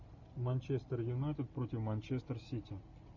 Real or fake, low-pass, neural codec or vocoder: real; 7.2 kHz; none